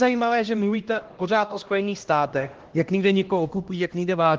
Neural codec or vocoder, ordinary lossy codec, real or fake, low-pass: codec, 16 kHz, 1 kbps, X-Codec, HuBERT features, trained on LibriSpeech; Opus, 16 kbps; fake; 7.2 kHz